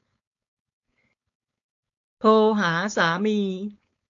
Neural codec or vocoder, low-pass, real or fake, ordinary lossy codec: codec, 16 kHz, 4.8 kbps, FACodec; 7.2 kHz; fake; AAC, 48 kbps